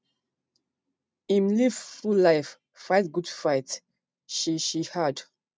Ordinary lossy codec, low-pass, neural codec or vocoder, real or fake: none; none; none; real